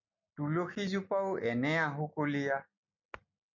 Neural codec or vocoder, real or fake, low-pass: none; real; 7.2 kHz